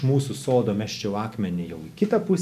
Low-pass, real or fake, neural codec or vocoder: 14.4 kHz; real; none